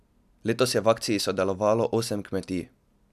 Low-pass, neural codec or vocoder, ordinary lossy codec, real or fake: 14.4 kHz; none; none; real